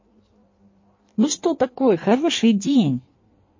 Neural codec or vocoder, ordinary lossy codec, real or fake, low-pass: codec, 16 kHz in and 24 kHz out, 1.1 kbps, FireRedTTS-2 codec; MP3, 32 kbps; fake; 7.2 kHz